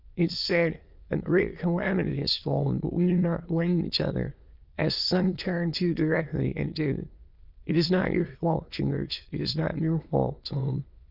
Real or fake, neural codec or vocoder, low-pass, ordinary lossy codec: fake; autoencoder, 22.05 kHz, a latent of 192 numbers a frame, VITS, trained on many speakers; 5.4 kHz; Opus, 24 kbps